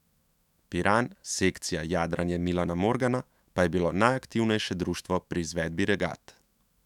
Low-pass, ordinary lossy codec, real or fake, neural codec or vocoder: 19.8 kHz; none; fake; autoencoder, 48 kHz, 128 numbers a frame, DAC-VAE, trained on Japanese speech